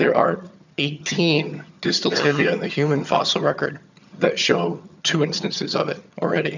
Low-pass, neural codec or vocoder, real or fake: 7.2 kHz; vocoder, 22.05 kHz, 80 mel bands, HiFi-GAN; fake